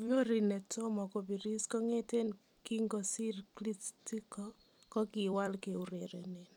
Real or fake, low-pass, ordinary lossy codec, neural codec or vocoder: fake; 19.8 kHz; none; vocoder, 44.1 kHz, 128 mel bands every 256 samples, BigVGAN v2